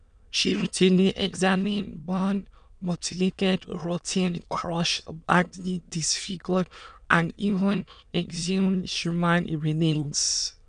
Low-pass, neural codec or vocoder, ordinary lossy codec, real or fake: 9.9 kHz; autoencoder, 22.05 kHz, a latent of 192 numbers a frame, VITS, trained on many speakers; none; fake